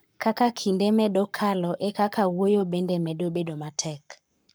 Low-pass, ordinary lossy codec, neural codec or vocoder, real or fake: none; none; codec, 44.1 kHz, 7.8 kbps, Pupu-Codec; fake